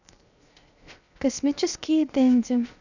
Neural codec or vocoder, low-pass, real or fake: codec, 16 kHz, 0.7 kbps, FocalCodec; 7.2 kHz; fake